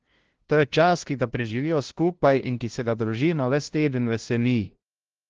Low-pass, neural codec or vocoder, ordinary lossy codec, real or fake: 7.2 kHz; codec, 16 kHz, 0.5 kbps, FunCodec, trained on LibriTTS, 25 frames a second; Opus, 16 kbps; fake